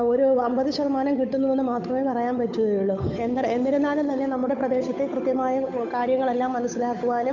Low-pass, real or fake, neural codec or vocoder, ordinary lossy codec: 7.2 kHz; fake; codec, 16 kHz, 8 kbps, FunCodec, trained on Chinese and English, 25 frames a second; none